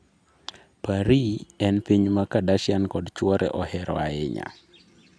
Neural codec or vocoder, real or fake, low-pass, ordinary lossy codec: none; real; none; none